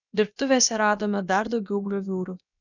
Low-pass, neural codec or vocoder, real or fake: 7.2 kHz; codec, 16 kHz, about 1 kbps, DyCAST, with the encoder's durations; fake